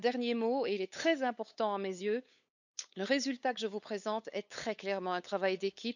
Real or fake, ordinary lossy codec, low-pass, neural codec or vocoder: fake; none; 7.2 kHz; codec, 16 kHz, 8 kbps, FunCodec, trained on LibriTTS, 25 frames a second